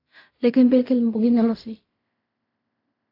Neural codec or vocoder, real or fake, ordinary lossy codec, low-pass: codec, 16 kHz in and 24 kHz out, 0.4 kbps, LongCat-Audio-Codec, fine tuned four codebook decoder; fake; AAC, 32 kbps; 5.4 kHz